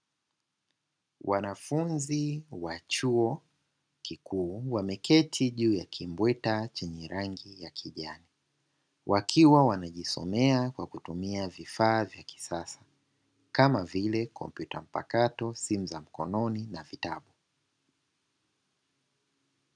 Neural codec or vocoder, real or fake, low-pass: none; real; 9.9 kHz